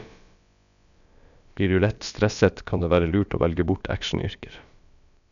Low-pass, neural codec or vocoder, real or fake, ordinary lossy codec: 7.2 kHz; codec, 16 kHz, about 1 kbps, DyCAST, with the encoder's durations; fake; none